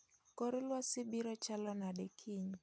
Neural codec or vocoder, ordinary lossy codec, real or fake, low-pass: none; none; real; none